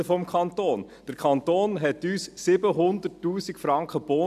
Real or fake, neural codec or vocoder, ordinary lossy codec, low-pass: real; none; none; 14.4 kHz